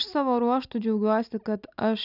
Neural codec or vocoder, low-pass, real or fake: none; 5.4 kHz; real